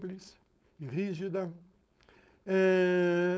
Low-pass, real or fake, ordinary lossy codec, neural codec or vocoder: none; fake; none; codec, 16 kHz, 4.8 kbps, FACodec